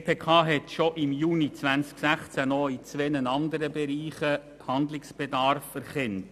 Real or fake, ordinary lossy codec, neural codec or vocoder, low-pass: real; none; none; 14.4 kHz